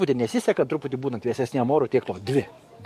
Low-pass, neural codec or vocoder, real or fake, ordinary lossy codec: 14.4 kHz; codec, 44.1 kHz, 7.8 kbps, DAC; fake; MP3, 64 kbps